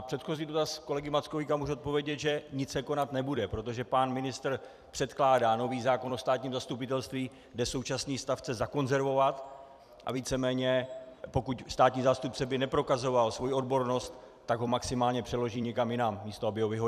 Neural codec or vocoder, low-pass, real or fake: vocoder, 44.1 kHz, 128 mel bands every 256 samples, BigVGAN v2; 14.4 kHz; fake